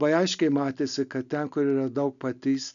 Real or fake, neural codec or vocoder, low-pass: real; none; 7.2 kHz